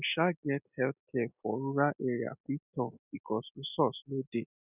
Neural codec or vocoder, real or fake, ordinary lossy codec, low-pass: none; real; none; 3.6 kHz